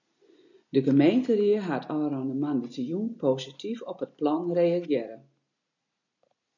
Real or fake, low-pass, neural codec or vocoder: real; 7.2 kHz; none